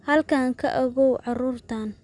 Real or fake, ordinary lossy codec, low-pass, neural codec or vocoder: real; none; 10.8 kHz; none